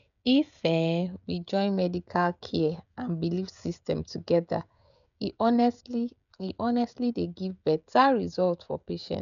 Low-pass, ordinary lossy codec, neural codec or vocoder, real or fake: 7.2 kHz; none; codec, 16 kHz, 16 kbps, FreqCodec, smaller model; fake